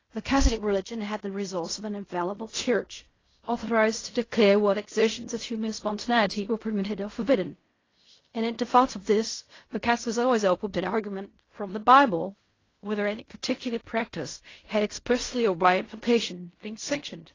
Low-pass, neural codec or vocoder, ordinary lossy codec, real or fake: 7.2 kHz; codec, 16 kHz in and 24 kHz out, 0.4 kbps, LongCat-Audio-Codec, fine tuned four codebook decoder; AAC, 32 kbps; fake